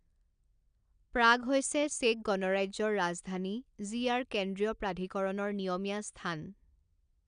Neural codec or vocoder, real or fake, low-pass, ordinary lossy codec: none; real; 9.9 kHz; none